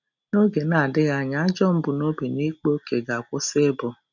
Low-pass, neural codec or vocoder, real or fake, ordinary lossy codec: 7.2 kHz; none; real; none